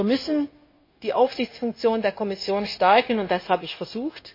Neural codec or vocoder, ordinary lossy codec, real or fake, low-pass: codec, 16 kHz, 0.9 kbps, LongCat-Audio-Codec; MP3, 24 kbps; fake; 5.4 kHz